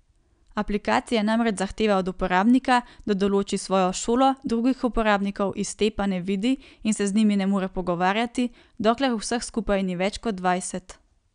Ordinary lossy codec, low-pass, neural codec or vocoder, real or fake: none; 9.9 kHz; none; real